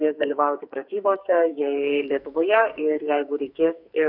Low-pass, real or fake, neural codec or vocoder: 5.4 kHz; fake; codec, 44.1 kHz, 2.6 kbps, SNAC